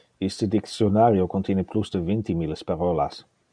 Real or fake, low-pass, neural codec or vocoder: real; 9.9 kHz; none